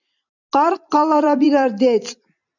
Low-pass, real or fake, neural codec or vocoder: 7.2 kHz; fake; vocoder, 44.1 kHz, 128 mel bands every 256 samples, BigVGAN v2